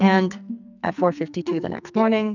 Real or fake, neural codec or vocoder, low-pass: fake; codec, 44.1 kHz, 2.6 kbps, SNAC; 7.2 kHz